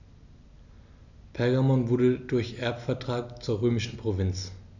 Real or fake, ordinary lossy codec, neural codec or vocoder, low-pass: real; none; none; 7.2 kHz